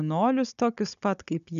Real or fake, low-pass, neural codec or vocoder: fake; 7.2 kHz; codec, 16 kHz, 16 kbps, FunCodec, trained on Chinese and English, 50 frames a second